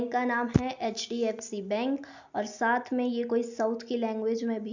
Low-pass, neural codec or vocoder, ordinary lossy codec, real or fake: 7.2 kHz; none; none; real